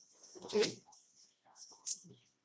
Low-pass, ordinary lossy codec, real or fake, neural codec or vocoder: none; none; fake; codec, 16 kHz, 2 kbps, FreqCodec, smaller model